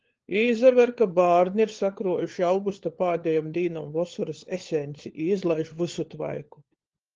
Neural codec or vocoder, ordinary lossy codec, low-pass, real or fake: codec, 16 kHz, 4 kbps, FunCodec, trained on LibriTTS, 50 frames a second; Opus, 16 kbps; 7.2 kHz; fake